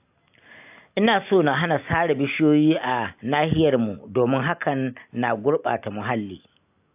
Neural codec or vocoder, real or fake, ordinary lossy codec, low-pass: none; real; AAC, 32 kbps; 3.6 kHz